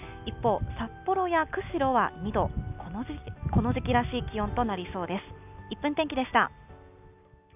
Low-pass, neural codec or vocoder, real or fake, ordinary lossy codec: 3.6 kHz; none; real; none